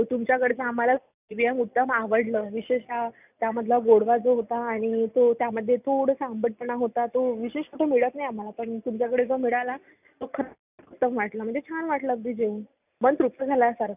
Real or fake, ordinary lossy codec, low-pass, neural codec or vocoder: real; none; 3.6 kHz; none